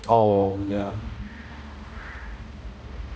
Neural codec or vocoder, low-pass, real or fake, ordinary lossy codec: codec, 16 kHz, 1 kbps, X-Codec, HuBERT features, trained on balanced general audio; none; fake; none